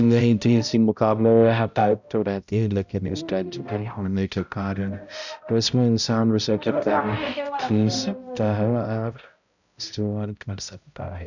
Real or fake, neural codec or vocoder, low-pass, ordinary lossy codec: fake; codec, 16 kHz, 0.5 kbps, X-Codec, HuBERT features, trained on balanced general audio; 7.2 kHz; none